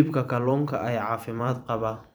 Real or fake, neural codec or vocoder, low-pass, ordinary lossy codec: fake; vocoder, 44.1 kHz, 128 mel bands every 256 samples, BigVGAN v2; none; none